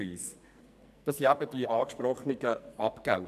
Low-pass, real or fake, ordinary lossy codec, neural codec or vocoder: 14.4 kHz; fake; none; codec, 44.1 kHz, 2.6 kbps, SNAC